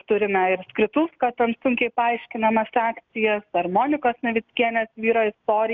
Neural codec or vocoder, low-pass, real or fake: none; 7.2 kHz; real